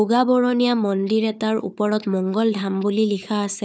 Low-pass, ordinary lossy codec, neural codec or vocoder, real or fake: none; none; codec, 16 kHz, 4 kbps, FunCodec, trained on Chinese and English, 50 frames a second; fake